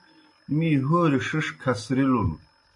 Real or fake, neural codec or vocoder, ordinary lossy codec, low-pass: real; none; AAC, 64 kbps; 10.8 kHz